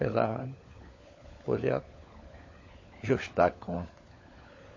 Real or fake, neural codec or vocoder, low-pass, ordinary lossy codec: fake; codec, 16 kHz, 16 kbps, FunCodec, trained on LibriTTS, 50 frames a second; 7.2 kHz; MP3, 32 kbps